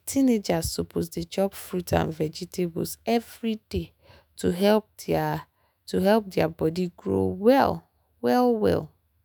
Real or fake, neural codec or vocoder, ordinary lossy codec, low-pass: fake; autoencoder, 48 kHz, 128 numbers a frame, DAC-VAE, trained on Japanese speech; none; none